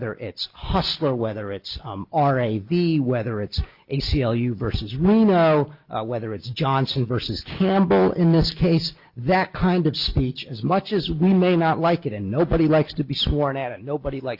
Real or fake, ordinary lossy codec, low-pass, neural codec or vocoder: real; Opus, 24 kbps; 5.4 kHz; none